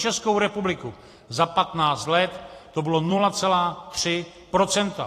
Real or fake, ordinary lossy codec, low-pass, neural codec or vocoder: fake; AAC, 48 kbps; 14.4 kHz; vocoder, 44.1 kHz, 128 mel bands every 256 samples, BigVGAN v2